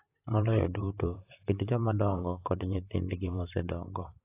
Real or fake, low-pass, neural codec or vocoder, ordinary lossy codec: fake; 3.6 kHz; vocoder, 44.1 kHz, 128 mel bands, Pupu-Vocoder; AAC, 24 kbps